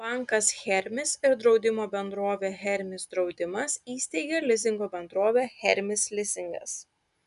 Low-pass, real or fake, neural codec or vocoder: 10.8 kHz; real; none